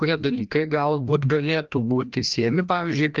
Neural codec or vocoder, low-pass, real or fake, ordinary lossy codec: codec, 16 kHz, 1 kbps, FreqCodec, larger model; 7.2 kHz; fake; Opus, 32 kbps